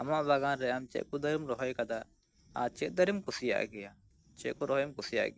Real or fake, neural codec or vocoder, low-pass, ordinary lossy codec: real; none; none; none